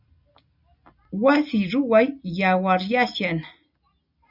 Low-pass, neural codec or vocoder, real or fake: 5.4 kHz; none; real